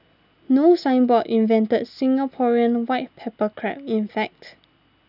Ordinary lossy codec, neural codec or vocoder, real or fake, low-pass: none; none; real; 5.4 kHz